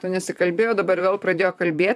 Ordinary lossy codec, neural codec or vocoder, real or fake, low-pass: AAC, 96 kbps; vocoder, 44.1 kHz, 128 mel bands, Pupu-Vocoder; fake; 14.4 kHz